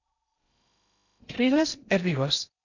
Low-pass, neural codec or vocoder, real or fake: 7.2 kHz; codec, 16 kHz in and 24 kHz out, 0.8 kbps, FocalCodec, streaming, 65536 codes; fake